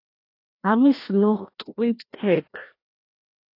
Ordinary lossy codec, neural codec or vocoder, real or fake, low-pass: AAC, 32 kbps; codec, 16 kHz, 1 kbps, FreqCodec, larger model; fake; 5.4 kHz